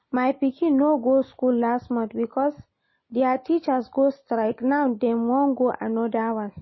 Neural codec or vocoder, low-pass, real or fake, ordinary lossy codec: none; 7.2 kHz; real; MP3, 24 kbps